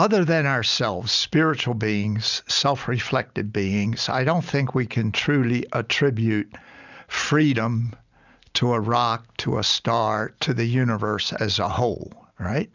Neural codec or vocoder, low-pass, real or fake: none; 7.2 kHz; real